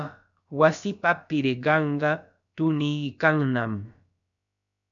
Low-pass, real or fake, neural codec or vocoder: 7.2 kHz; fake; codec, 16 kHz, about 1 kbps, DyCAST, with the encoder's durations